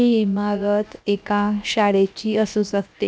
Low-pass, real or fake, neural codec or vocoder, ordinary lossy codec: none; fake; codec, 16 kHz, 0.7 kbps, FocalCodec; none